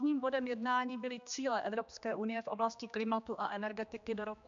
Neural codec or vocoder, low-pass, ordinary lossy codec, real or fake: codec, 16 kHz, 2 kbps, X-Codec, HuBERT features, trained on general audio; 7.2 kHz; AAC, 64 kbps; fake